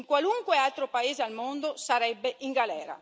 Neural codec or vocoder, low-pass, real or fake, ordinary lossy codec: none; none; real; none